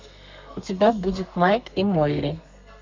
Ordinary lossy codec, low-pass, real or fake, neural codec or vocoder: MP3, 64 kbps; 7.2 kHz; fake; codec, 32 kHz, 1.9 kbps, SNAC